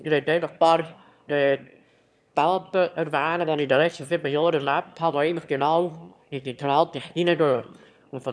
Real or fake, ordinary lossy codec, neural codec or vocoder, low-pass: fake; none; autoencoder, 22.05 kHz, a latent of 192 numbers a frame, VITS, trained on one speaker; none